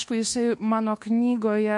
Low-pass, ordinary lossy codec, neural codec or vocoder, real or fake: 10.8 kHz; MP3, 48 kbps; codec, 24 kHz, 1.2 kbps, DualCodec; fake